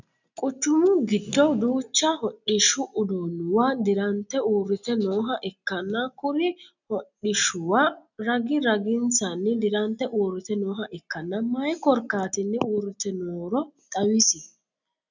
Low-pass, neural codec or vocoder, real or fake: 7.2 kHz; none; real